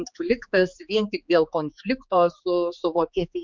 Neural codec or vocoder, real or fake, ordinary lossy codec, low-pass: codec, 16 kHz, 4 kbps, X-Codec, HuBERT features, trained on general audio; fake; MP3, 64 kbps; 7.2 kHz